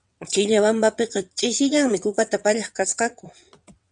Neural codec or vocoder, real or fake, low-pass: vocoder, 22.05 kHz, 80 mel bands, WaveNeXt; fake; 9.9 kHz